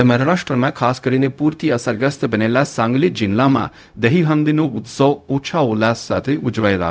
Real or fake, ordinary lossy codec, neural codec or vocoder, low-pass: fake; none; codec, 16 kHz, 0.4 kbps, LongCat-Audio-Codec; none